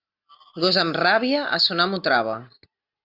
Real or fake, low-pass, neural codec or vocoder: real; 5.4 kHz; none